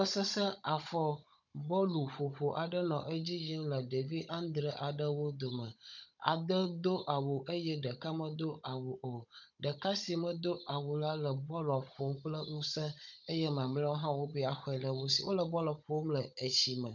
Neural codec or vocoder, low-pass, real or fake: codec, 16 kHz, 16 kbps, FunCodec, trained on Chinese and English, 50 frames a second; 7.2 kHz; fake